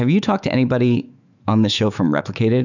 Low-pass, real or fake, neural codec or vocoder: 7.2 kHz; fake; autoencoder, 48 kHz, 128 numbers a frame, DAC-VAE, trained on Japanese speech